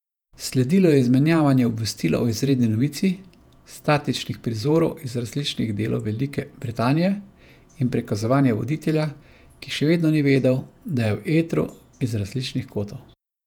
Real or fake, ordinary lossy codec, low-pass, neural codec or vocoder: fake; none; 19.8 kHz; vocoder, 48 kHz, 128 mel bands, Vocos